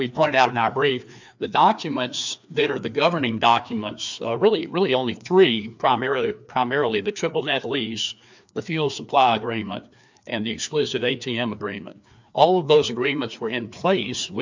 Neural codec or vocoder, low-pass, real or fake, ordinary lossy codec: codec, 16 kHz, 2 kbps, FreqCodec, larger model; 7.2 kHz; fake; MP3, 64 kbps